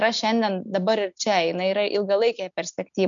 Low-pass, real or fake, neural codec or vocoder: 7.2 kHz; real; none